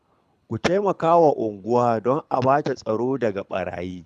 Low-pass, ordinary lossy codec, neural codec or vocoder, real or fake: none; none; codec, 24 kHz, 6 kbps, HILCodec; fake